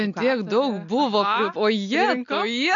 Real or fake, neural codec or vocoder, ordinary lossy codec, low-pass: real; none; AAC, 64 kbps; 7.2 kHz